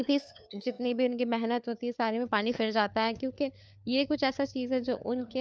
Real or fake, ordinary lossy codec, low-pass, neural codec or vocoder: fake; none; none; codec, 16 kHz, 4 kbps, FunCodec, trained on LibriTTS, 50 frames a second